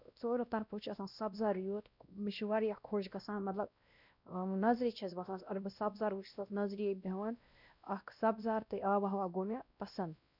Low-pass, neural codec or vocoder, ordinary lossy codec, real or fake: 5.4 kHz; codec, 16 kHz, 1 kbps, X-Codec, WavLM features, trained on Multilingual LibriSpeech; none; fake